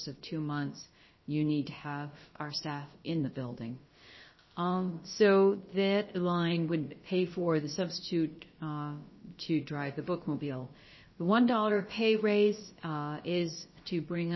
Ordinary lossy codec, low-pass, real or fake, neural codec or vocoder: MP3, 24 kbps; 7.2 kHz; fake; codec, 16 kHz, about 1 kbps, DyCAST, with the encoder's durations